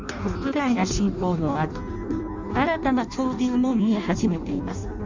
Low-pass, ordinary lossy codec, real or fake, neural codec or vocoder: 7.2 kHz; Opus, 64 kbps; fake; codec, 16 kHz in and 24 kHz out, 0.6 kbps, FireRedTTS-2 codec